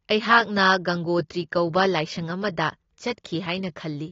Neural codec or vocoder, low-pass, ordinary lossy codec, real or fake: none; 7.2 kHz; AAC, 32 kbps; real